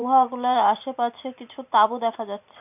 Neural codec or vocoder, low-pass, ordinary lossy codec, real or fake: vocoder, 44.1 kHz, 128 mel bands every 512 samples, BigVGAN v2; 3.6 kHz; none; fake